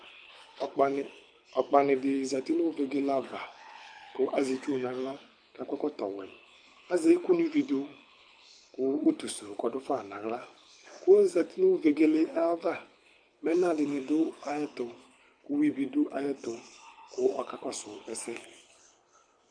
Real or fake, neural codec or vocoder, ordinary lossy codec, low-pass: fake; codec, 24 kHz, 6 kbps, HILCodec; MP3, 64 kbps; 9.9 kHz